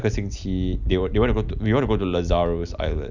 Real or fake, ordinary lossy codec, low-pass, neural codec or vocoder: real; none; 7.2 kHz; none